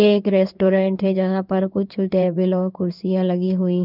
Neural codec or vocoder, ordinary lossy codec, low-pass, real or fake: codec, 16 kHz in and 24 kHz out, 1 kbps, XY-Tokenizer; none; 5.4 kHz; fake